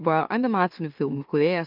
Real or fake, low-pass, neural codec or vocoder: fake; 5.4 kHz; autoencoder, 44.1 kHz, a latent of 192 numbers a frame, MeloTTS